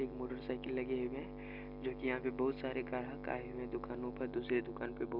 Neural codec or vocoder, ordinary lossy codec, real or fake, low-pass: none; none; real; 5.4 kHz